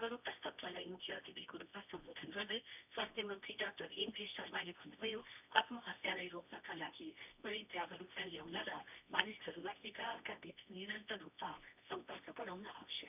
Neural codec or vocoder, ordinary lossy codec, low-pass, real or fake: codec, 24 kHz, 0.9 kbps, WavTokenizer, medium music audio release; none; 3.6 kHz; fake